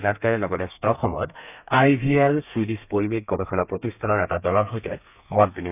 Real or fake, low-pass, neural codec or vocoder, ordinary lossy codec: fake; 3.6 kHz; codec, 32 kHz, 1.9 kbps, SNAC; none